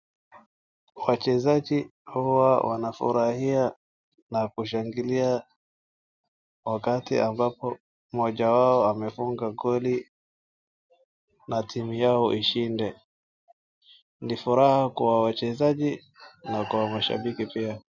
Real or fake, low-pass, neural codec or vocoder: real; 7.2 kHz; none